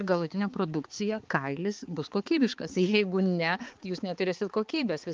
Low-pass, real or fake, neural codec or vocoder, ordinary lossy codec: 7.2 kHz; fake; codec, 16 kHz, 4 kbps, X-Codec, HuBERT features, trained on balanced general audio; Opus, 24 kbps